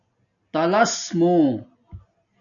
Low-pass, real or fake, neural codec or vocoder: 7.2 kHz; real; none